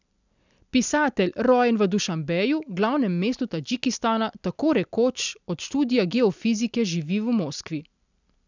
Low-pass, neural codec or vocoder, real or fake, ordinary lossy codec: 7.2 kHz; none; real; none